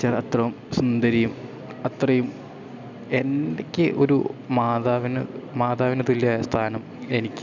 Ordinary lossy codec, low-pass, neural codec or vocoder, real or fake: none; 7.2 kHz; none; real